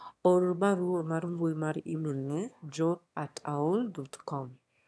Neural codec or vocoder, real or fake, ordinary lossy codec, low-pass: autoencoder, 22.05 kHz, a latent of 192 numbers a frame, VITS, trained on one speaker; fake; none; none